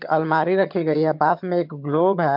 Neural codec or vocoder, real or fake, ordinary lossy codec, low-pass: vocoder, 22.05 kHz, 80 mel bands, HiFi-GAN; fake; MP3, 48 kbps; 5.4 kHz